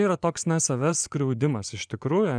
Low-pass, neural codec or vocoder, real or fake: 9.9 kHz; vocoder, 24 kHz, 100 mel bands, Vocos; fake